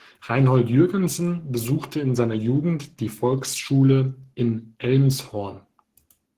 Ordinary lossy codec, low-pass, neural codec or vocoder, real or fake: Opus, 16 kbps; 14.4 kHz; codec, 44.1 kHz, 7.8 kbps, Pupu-Codec; fake